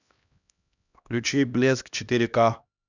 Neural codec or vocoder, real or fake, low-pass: codec, 16 kHz, 1 kbps, X-Codec, HuBERT features, trained on LibriSpeech; fake; 7.2 kHz